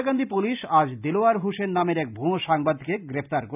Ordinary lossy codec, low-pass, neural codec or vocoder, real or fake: none; 3.6 kHz; none; real